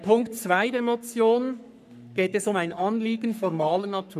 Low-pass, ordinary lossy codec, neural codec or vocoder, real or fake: 14.4 kHz; none; codec, 44.1 kHz, 3.4 kbps, Pupu-Codec; fake